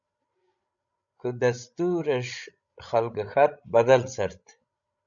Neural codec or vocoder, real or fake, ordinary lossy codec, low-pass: codec, 16 kHz, 16 kbps, FreqCodec, larger model; fake; MP3, 96 kbps; 7.2 kHz